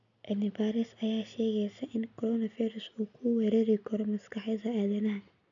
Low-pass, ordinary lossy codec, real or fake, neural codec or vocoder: 7.2 kHz; MP3, 96 kbps; real; none